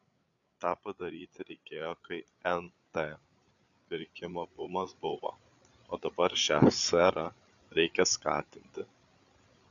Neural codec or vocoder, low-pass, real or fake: codec, 16 kHz, 8 kbps, FreqCodec, larger model; 7.2 kHz; fake